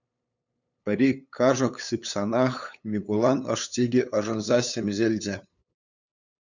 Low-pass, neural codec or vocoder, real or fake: 7.2 kHz; codec, 16 kHz, 8 kbps, FunCodec, trained on LibriTTS, 25 frames a second; fake